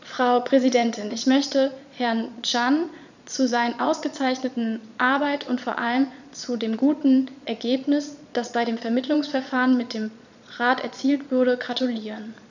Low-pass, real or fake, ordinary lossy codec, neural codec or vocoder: 7.2 kHz; fake; none; vocoder, 22.05 kHz, 80 mel bands, Vocos